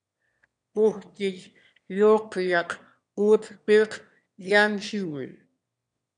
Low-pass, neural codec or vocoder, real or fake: 9.9 kHz; autoencoder, 22.05 kHz, a latent of 192 numbers a frame, VITS, trained on one speaker; fake